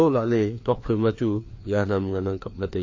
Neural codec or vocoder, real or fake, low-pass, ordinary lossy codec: codec, 16 kHz, 2 kbps, FreqCodec, larger model; fake; 7.2 kHz; MP3, 32 kbps